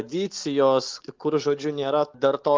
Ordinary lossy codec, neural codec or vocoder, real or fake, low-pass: Opus, 32 kbps; none; real; 7.2 kHz